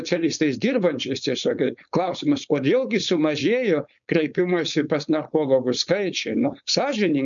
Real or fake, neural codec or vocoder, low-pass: fake; codec, 16 kHz, 4.8 kbps, FACodec; 7.2 kHz